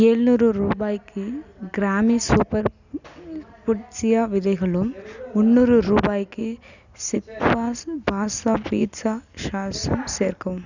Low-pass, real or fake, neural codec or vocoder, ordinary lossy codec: 7.2 kHz; real; none; none